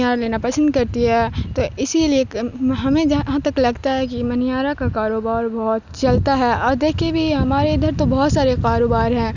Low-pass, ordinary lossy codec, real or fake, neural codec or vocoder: 7.2 kHz; none; real; none